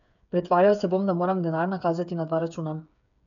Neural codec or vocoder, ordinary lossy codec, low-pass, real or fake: codec, 16 kHz, 8 kbps, FreqCodec, smaller model; none; 7.2 kHz; fake